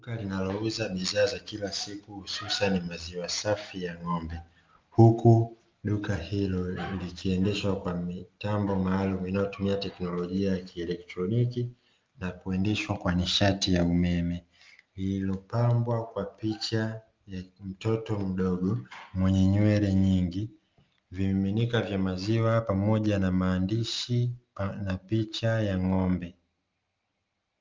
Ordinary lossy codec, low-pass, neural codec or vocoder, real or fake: Opus, 32 kbps; 7.2 kHz; none; real